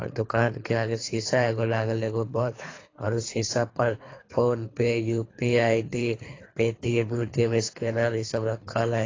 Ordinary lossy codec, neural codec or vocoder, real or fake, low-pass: AAC, 32 kbps; codec, 24 kHz, 3 kbps, HILCodec; fake; 7.2 kHz